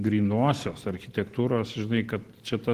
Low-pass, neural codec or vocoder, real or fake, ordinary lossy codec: 14.4 kHz; none; real; Opus, 16 kbps